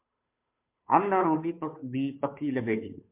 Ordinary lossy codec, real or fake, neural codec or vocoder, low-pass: MP3, 32 kbps; fake; codec, 16 kHz, 2 kbps, FunCodec, trained on Chinese and English, 25 frames a second; 3.6 kHz